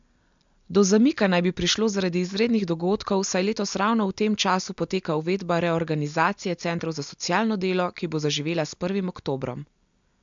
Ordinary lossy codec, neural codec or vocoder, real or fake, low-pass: MP3, 64 kbps; none; real; 7.2 kHz